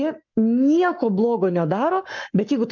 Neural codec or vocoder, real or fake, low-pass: codec, 44.1 kHz, 7.8 kbps, Pupu-Codec; fake; 7.2 kHz